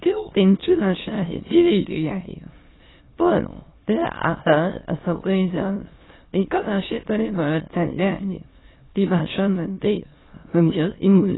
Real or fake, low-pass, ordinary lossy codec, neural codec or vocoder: fake; 7.2 kHz; AAC, 16 kbps; autoencoder, 22.05 kHz, a latent of 192 numbers a frame, VITS, trained on many speakers